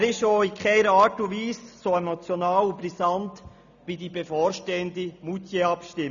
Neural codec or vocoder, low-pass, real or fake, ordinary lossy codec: none; 7.2 kHz; real; none